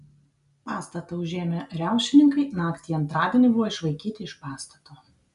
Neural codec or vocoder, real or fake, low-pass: none; real; 10.8 kHz